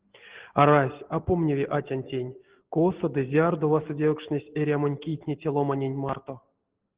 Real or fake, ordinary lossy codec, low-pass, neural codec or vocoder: real; Opus, 16 kbps; 3.6 kHz; none